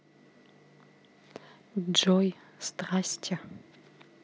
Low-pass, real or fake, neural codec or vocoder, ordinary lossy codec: none; real; none; none